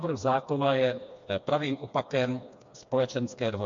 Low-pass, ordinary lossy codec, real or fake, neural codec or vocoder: 7.2 kHz; MP3, 48 kbps; fake; codec, 16 kHz, 2 kbps, FreqCodec, smaller model